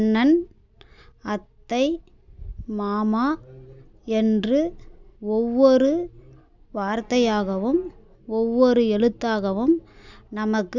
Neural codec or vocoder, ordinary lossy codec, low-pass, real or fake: none; none; 7.2 kHz; real